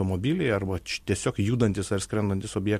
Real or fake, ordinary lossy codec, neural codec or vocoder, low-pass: real; MP3, 64 kbps; none; 14.4 kHz